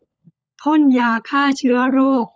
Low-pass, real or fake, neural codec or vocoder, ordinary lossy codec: none; fake; codec, 16 kHz, 16 kbps, FunCodec, trained on LibriTTS, 50 frames a second; none